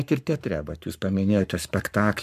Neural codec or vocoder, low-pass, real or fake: codec, 44.1 kHz, 7.8 kbps, Pupu-Codec; 14.4 kHz; fake